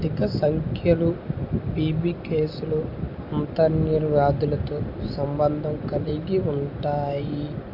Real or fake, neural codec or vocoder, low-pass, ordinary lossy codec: real; none; 5.4 kHz; none